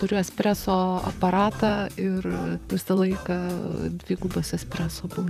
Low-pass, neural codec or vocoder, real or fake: 14.4 kHz; vocoder, 44.1 kHz, 128 mel bands, Pupu-Vocoder; fake